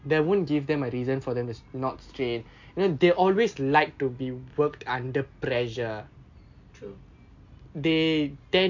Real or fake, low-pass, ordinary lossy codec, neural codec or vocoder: real; 7.2 kHz; MP3, 48 kbps; none